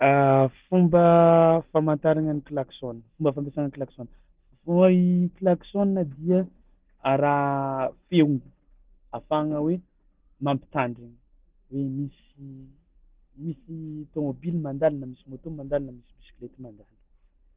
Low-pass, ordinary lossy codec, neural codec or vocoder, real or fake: 3.6 kHz; Opus, 16 kbps; none; real